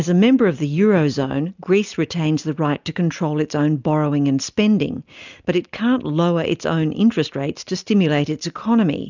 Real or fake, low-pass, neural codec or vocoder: real; 7.2 kHz; none